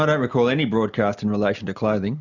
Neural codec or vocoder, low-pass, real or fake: none; 7.2 kHz; real